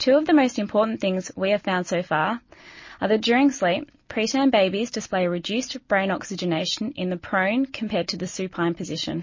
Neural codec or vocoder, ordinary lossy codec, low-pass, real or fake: none; MP3, 32 kbps; 7.2 kHz; real